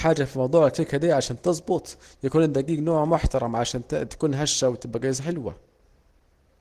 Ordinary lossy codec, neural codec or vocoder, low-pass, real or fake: Opus, 16 kbps; none; 14.4 kHz; real